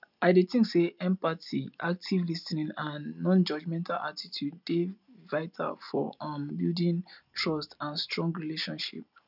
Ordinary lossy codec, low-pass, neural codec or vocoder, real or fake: none; 5.4 kHz; none; real